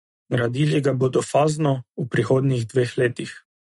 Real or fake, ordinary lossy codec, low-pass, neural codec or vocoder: real; MP3, 48 kbps; 19.8 kHz; none